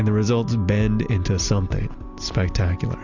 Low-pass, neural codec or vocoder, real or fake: 7.2 kHz; none; real